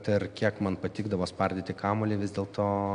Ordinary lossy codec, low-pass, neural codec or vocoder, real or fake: AAC, 96 kbps; 9.9 kHz; none; real